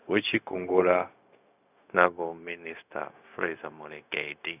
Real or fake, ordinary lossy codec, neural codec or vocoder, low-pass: fake; none; codec, 16 kHz, 0.4 kbps, LongCat-Audio-Codec; 3.6 kHz